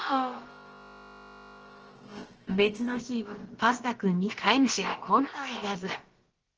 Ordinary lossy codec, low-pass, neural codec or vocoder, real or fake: Opus, 16 kbps; 7.2 kHz; codec, 16 kHz, about 1 kbps, DyCAST, with the encoder's durations; fake